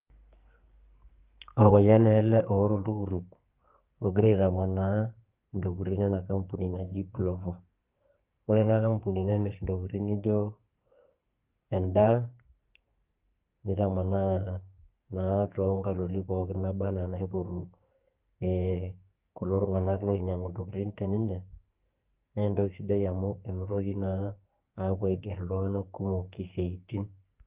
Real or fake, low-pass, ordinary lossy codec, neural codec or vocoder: fake; 3.6 kHz; Opus, 24 kbps; codec, 44.1 kHz, 2.6 kbps, SNAC